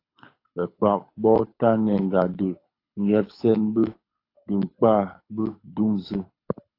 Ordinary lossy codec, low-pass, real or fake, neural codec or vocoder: AAC, 32 kbps; 5.4 kHz; fake; codec, 24 kHz, 6 kbps, HILCodec